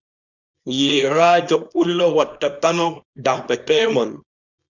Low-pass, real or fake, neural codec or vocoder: 7.2 kHz; fake; codec, 24 kHz, 0.9 kbps, WavTokenizer, small release